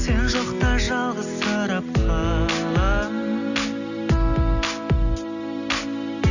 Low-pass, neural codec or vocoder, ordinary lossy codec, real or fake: 7.2 kHz; none; none; real